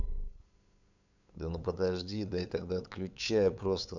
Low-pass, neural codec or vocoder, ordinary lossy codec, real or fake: 7.2 kHz; codec, 16 kHz, 8 kbps, FunCodec, trained on LibriTTS, 25 frames a second; none; fake